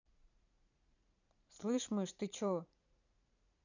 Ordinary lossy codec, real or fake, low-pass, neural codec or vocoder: none; fake; 7.2 kHz; vocoder, 44.1 kHz, 80 mel bands, Vocos